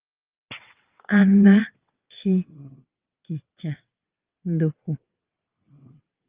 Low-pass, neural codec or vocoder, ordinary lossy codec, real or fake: 3.6 kHz; vocoder, 22.05 kHz, 80 mel bands, WaveNeXt; Opus, 32 kbps; fake